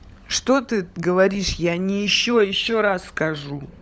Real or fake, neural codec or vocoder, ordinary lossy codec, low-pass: fake; codec, 16 kHz, 16 kbps, FunCodec, trained on LibriTTS, 50 frames a second; none; none